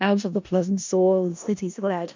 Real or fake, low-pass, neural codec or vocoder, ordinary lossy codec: fake; 7.2 kHz; codec, 16 kHz in and 24 kHz out, 0.4 kbps, LongCat-Audio-Codec, four codebook decoder; MP3, 64 kbps